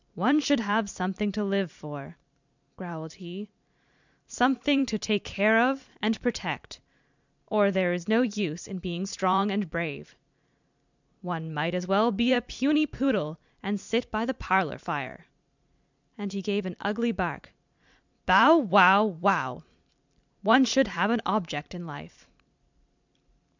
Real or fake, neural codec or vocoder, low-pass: fake; vocoder, 44.1 kHz, 128 mel bands every 512 samples, BigVGAN v2; 7.2 kHz